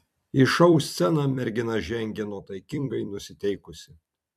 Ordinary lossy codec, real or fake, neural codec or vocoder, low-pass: MP3, 96 kbps; fake; vocoder, 44.1 kHz, 128 mel bands every 256 samples, BigVGAN v2; 14.4 kHz